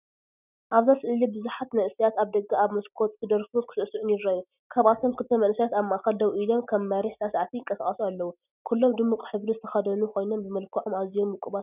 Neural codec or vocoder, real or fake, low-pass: none; real; 3.6 kHz